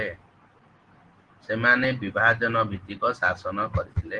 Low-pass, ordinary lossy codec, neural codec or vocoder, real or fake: 9.9 kHz; Opus, 16 kbps; none; real